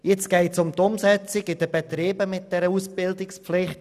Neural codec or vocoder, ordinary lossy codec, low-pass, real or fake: none; none; 14.4 kHz; real